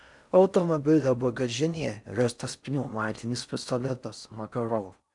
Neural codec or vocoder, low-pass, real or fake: codec, 16 kHz in and 24 kHz out, 0.6 kbps, FocalCodec, streaming, 4096 codes; 10.8 kHz; fake